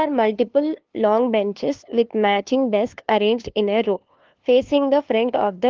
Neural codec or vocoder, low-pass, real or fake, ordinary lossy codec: codec, 16 kHz, 2 kbps, FunCodec, trained on LibriTTS, 25 frames a second; 7.2 kHz; fake; Opus, 16 kbps